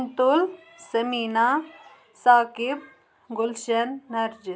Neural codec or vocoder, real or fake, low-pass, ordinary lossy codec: none; real; none; none